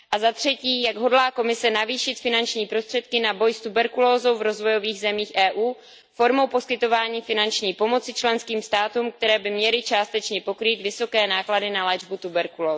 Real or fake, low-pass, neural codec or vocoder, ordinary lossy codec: real; none; none; none